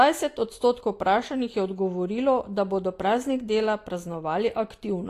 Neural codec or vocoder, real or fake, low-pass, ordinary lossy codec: none; real; 14.4 kHz; AAC, 48 kbps